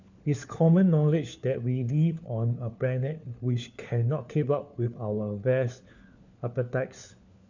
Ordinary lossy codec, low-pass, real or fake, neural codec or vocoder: none; 7.2 kHz; fake; codec, 16 kHz, 4 kbps, FunCodec, trained on LibriTTS, 50 frames a second